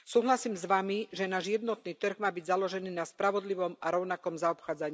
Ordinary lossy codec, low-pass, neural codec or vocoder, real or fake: none; none; none; real